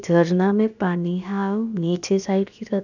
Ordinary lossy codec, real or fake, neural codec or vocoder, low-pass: none; fake; codec, 16 kHz, about 1 kbps, DyCAST, with the encoder's durations; 7.2 kHz